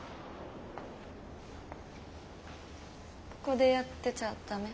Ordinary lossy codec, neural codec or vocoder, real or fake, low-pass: none; none; real; none